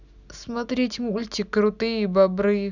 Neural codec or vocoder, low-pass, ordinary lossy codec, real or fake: none; 7.2 kHz; none; real